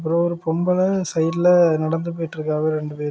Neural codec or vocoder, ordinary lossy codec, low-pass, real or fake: none; none; none; real